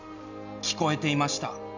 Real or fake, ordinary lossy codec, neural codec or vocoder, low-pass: real; none; none; 7.2 kHz